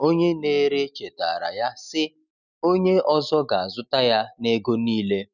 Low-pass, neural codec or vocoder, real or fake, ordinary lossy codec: 7.2 kHz; none; real; none